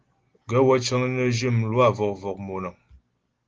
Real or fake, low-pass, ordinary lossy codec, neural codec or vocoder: real; 7.2 kHz; Opus, 16 kbps; none